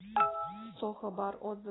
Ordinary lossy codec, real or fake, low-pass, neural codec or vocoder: AAC, 16 kbps; real; 7.2 kHz; none